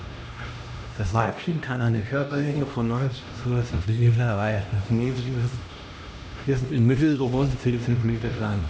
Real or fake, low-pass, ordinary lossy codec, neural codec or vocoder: fake; none; none; codec, 16 kHz, 1 kbps, X-Codec, HuBERT features, trained on LibriSpeech